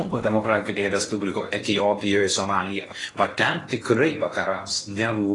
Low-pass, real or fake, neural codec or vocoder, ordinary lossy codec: 10.8 kHz; fake; codec, 16 kHz in and 24 kHz out, 0.6 kbps, FocalCodec, streaming, 4096 codes; AAC, 32 kbps